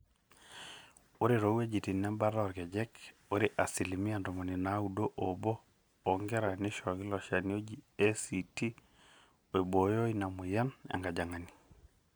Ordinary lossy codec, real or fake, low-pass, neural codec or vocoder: none; real; none; none